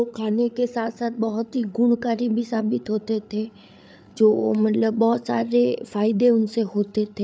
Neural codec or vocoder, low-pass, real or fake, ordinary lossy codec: codec, 16 kHz, 8 kbps, FreqCodec, larger model; none; fake; none